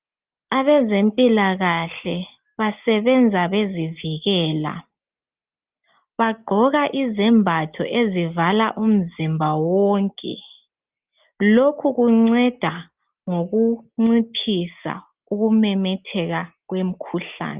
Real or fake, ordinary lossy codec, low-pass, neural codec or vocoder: real; Opus, 32 kbps; 3.6 kHz; none